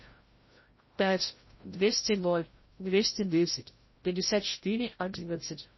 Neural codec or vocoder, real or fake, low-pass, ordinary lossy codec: codec, 16 kHz, 0.5 kbps, FreqCodec, larger model; fake; 7.2 kHz; MP3, 24 kbps